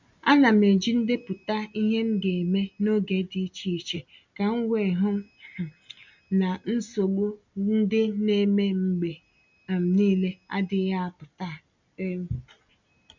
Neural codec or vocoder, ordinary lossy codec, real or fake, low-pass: none; MP3, 64 kbps; real; 7.2 kHz